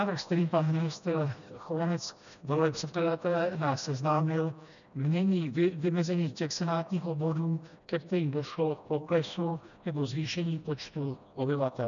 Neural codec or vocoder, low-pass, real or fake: codec, 16 kHz, 1 kbps, FreqCodec, smaller model; 7.2 kHz; fake